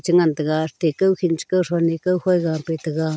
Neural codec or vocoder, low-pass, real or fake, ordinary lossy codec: none; none; real; none